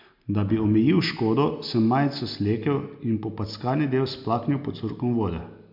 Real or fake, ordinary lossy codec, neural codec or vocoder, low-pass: real; MP3, 48 kbps; none; 5.4 kHz